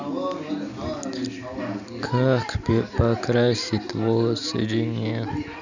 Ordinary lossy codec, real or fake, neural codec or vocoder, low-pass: none; real; none; 7.2 kHz